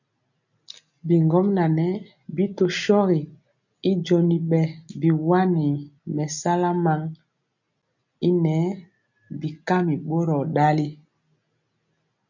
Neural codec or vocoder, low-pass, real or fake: none; 7.2 kHz; real